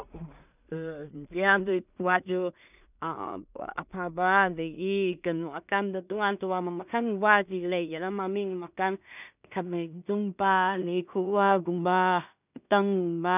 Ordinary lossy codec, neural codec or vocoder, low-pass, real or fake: none; codec, 16 kHz in and 24 kHz out, 0.4 kbps, LongCat-Audio-Codec, two codebook decoder; 3.6 kHz; fake